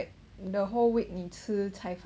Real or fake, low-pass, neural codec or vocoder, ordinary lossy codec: real; none; none; none